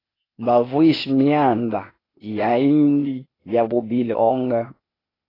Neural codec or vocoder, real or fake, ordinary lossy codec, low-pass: codec, 16 kHz, 0.8 kbps, ZipCodec; fake; AAC, 24 kbps; 5.4 kHz